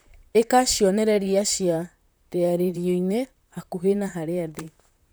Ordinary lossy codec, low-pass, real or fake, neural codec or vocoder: none; none; fake; vocoder, 44.1 kHz, 128 mel bands, Pupu-Vocoder